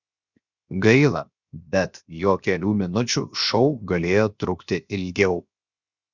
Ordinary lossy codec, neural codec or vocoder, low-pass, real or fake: Opus, 64 kbps; codec, 16 kHz, 0.7 kbps, FocalCodec; 7.2 kHz; fake